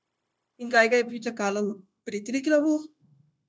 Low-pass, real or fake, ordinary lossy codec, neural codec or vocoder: none; fake; none; codec, 16 kHz, 0.9 kbps, LongCat-Audio-Codec